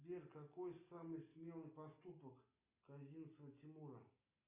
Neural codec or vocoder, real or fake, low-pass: none; real; 3.6 kHz